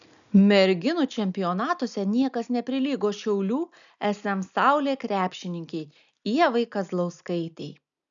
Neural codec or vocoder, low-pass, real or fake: none; 7.2 kHz; real